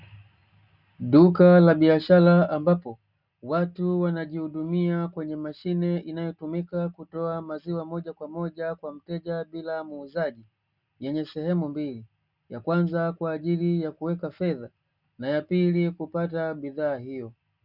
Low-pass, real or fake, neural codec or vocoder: 5.4 kHz; real; none